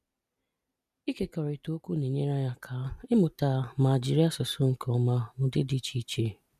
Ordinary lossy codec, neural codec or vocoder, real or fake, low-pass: none; none; real; 14.4 kHz